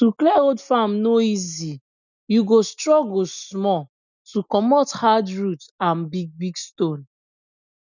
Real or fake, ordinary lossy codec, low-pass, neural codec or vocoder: real; none; 7.2 kHz; none